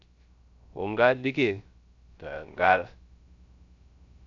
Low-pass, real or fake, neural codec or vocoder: 7.2 kHz; fake; codec, 16 kHz, 0.3 kbps, FocalCodec